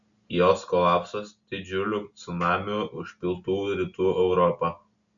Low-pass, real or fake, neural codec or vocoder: 7.2 kHz; real; none